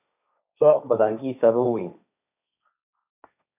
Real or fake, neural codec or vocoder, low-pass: fake; codec, 16 kHz, 1.1 kbps, Voila-Tokenizer; 3.6 kHz